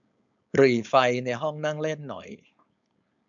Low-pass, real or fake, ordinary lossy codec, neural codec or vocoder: 7.2 kHz; fake; none; codec, 16 kHz, 4.8 kbps, FACodec